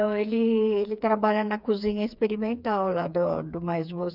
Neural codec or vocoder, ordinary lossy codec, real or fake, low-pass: codec, 16 kHz, 4 kbps, FreqCodec, smaller model; none; fake; 5.4 kHz